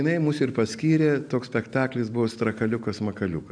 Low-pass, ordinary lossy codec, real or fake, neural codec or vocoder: 9.9 kHz; MP3, 96 kbps; real; none